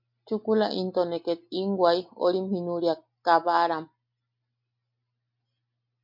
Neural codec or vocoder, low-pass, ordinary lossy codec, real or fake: none; 5.4 kHz; MP3, 48 kbps; real